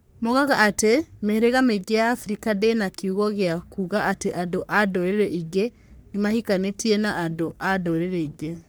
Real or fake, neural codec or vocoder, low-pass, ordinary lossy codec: fake; codec, 44.1 kHz, 3.4 kbps, Pupu-Codec; none; none